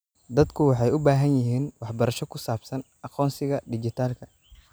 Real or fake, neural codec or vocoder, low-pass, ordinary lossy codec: real; none; none; none